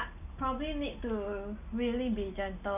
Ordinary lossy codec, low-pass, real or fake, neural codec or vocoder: none; 3.6 kHz; real; none